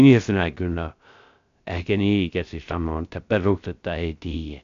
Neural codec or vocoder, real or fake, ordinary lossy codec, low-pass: codec, 16 kHz, 0.3 kbps, FocalCodec; fake; AAC, 64 kbps; 7.2 kHz